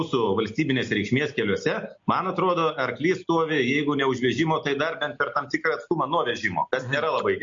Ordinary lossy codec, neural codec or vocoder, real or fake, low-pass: MP3, 48 kbps; none; real; 7.2 kHz